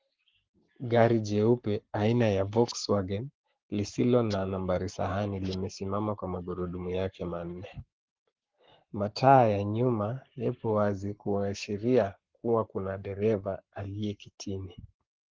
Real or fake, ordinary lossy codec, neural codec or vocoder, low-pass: fake; Opus, 16 kbps; codec, 44.1 kHz, 7.8 kbps, Pupu-Codec; 7.2 kHz